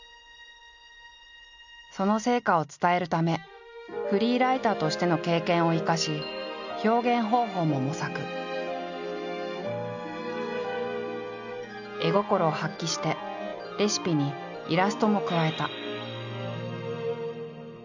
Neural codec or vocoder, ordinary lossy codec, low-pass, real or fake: none; none; 7.2 kHz; real